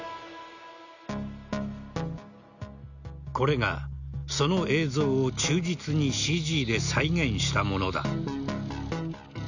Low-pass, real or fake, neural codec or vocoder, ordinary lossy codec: 7.2 kHz; real; none; none